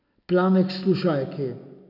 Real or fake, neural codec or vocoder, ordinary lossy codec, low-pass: real; none; none; 5.4 kHz